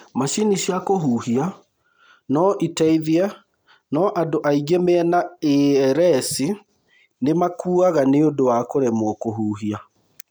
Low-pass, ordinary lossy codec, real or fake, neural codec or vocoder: none; none; real; none